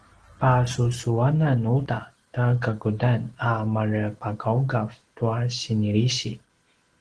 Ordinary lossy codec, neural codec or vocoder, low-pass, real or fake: Opus, 16 kbps; none; 9.9 kHz; real